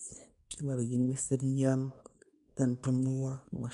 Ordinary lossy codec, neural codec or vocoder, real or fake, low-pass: none; codec, 24 kHz, 0.9 kbps, WavTokenizer, small release; fake; 10.8 kHz